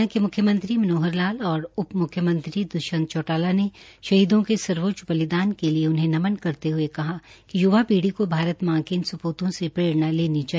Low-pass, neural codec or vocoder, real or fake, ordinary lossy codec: none; none; real; none